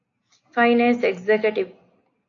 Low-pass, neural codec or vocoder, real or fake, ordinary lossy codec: 7.2 kHz; none; real; AAC, 48 kbps